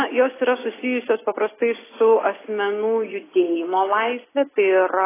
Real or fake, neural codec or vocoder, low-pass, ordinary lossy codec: real; none; 3.6 kHz; AAC, 16 kbps